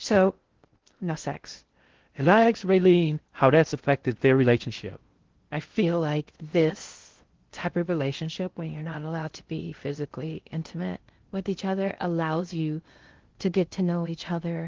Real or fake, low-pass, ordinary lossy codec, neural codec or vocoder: fake; 7.2 kHz; Opus, 16 kbps; codec, 16 kHz in and 24 kHz out, 0.6 kbps, FocalCodec, streaming, 4096 codes